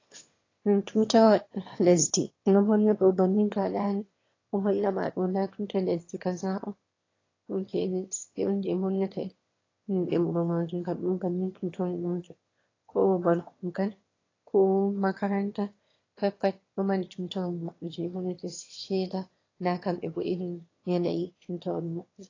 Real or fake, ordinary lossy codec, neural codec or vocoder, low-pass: fake; AAC, 32 kbps; autoencoder, 22.05 kHz, a latent of 192 numbers a frame, VITS, trained on one speaker; 7.2 kHz